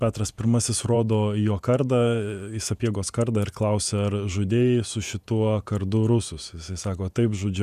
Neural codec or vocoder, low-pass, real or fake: vocoder, 48 kHz, 128 mel bands, Vocos; 14.4 kHz; fake